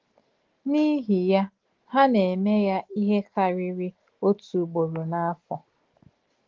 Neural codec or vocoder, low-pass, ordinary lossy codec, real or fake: none; 7.2 kHz; Opus, 16 kbps; real